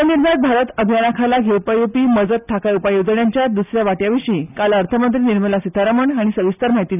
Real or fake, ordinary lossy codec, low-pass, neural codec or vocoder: real; none; 3.6 kHz; none